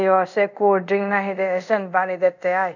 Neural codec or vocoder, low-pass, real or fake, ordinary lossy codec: codec, 24 kHz, 0.5 kbps, DualCodec; 7.2 kHz; fake; none